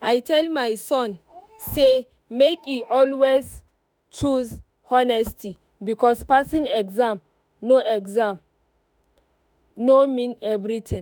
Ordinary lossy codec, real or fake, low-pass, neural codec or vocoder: none; fake; none; autoencoder, 48 kHz, 32 numbers a frame, DAC-VAE, trained on Japanese speech